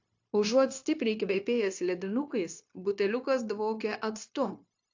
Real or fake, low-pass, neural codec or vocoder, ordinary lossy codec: fake; 7.2 kHz; codec, 16 kHz, 0.9 kbps, LongCat-Audio-Codec; AAC, 48 kbps